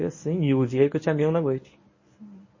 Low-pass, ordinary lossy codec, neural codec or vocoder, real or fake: 7.2 kHz; MP3, 32 kbps; codec, 24 kHz, 0.9 kbps, WavTokenizer, medium speech release version 1; fake